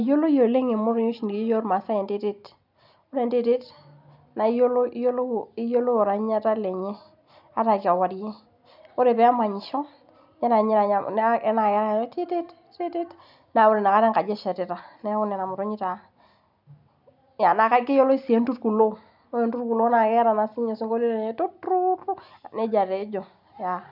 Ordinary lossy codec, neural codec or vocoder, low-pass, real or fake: none; none; 5.4 kHz; real